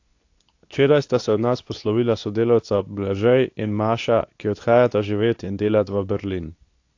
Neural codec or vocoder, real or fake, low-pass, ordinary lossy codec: codec, 24 kHz, 0.9 kbps, WavTokenizer, medium speech release version 2; fake; 7.2 kHz; AAC, 48 kbps